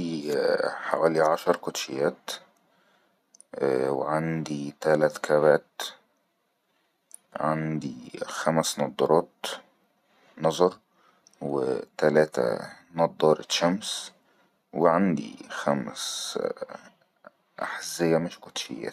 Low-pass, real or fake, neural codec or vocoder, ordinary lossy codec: 14.4 kHz; real; none; none